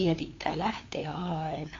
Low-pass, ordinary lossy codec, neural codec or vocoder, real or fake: 7.2 kHz; AAC, 32 kbps; codec, 16 kHz, 2 kbps, FunCodec, trained on LibriTTS, 25 frames a second; fake